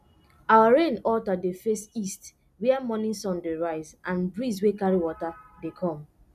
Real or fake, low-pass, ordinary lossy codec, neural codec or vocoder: real; 14.4 kHz; none; none